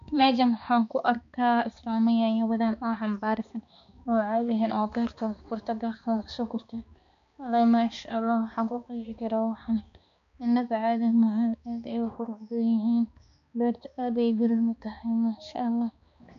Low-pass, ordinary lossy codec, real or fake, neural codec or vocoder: 7.2 kHz; MP3, 64 kbps; fake; codec, 16 kHz, 2 kbps, X-Codec, HuBERT features, trained on balanced general audio